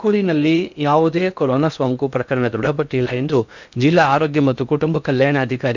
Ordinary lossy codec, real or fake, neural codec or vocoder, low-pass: none; fake; codec, 16 kHz in and 24 kHz out, 0.8 kbps, FocalCodec, streaming, 65536 codes; 7.2 kHz